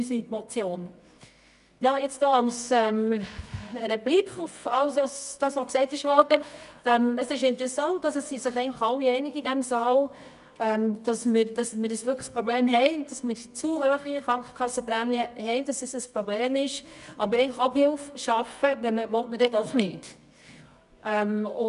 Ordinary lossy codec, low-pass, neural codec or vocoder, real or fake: none; 10.8 kHz; codec, 24 kHz, 0.9 kbps, WavTokenizer, medium music audio release; fake